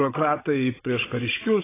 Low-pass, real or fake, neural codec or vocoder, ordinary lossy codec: 3.6 kHz; fake; codec, 16 kHz, 16 kbps, FunCodec, trained on Chinese and English, 50 frames a second; AAC, 16 kbps